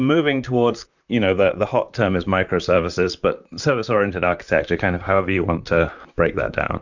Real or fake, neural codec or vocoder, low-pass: fake; vocoder, 44.1 kHz, 128 mel bands, Pupu-Vocoder; 7.2 kHz